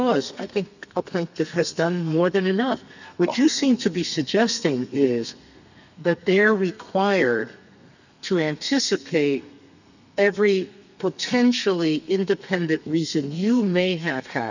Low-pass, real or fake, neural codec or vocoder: 7.2 kHz; fake; codec, 32 kHz, 1.9 kbps, SNAC